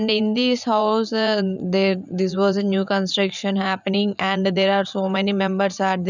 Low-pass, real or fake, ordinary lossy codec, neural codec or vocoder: 7.2 kHz; fake; none; vocoder, 44.1 kHz, 80 mel bands, Vocos